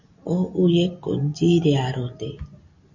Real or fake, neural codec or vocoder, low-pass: real; none; 7.2 kHz